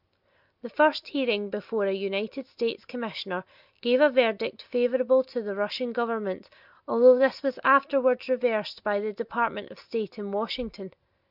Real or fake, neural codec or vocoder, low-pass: real; none; 5.4 kHz